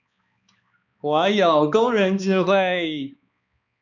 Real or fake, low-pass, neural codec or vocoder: fake; 7.2 kHz; codec, 16 kHz, 2 kbps, X-Codec, HuBERT features, trained on balanced general audio